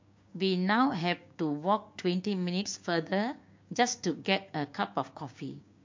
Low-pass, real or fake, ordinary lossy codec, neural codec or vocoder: 7.2 kHz; fake; MP3, 48 kbps; codec, 16 kHz, 6 kbps, DAC